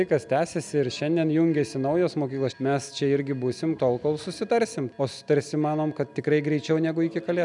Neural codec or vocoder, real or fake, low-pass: none; real; 10.8 kHz